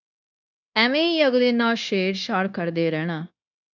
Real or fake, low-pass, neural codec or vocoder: fake; 7.2 kHz; codec, 16 kHz, 0.9 kbps, LongCat-Audio-Codec